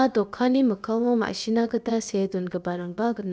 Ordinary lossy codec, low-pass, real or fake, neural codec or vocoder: none; none; fake; codec, 16 kHz, about 1 kbps, DyCAST, with the encoder's durations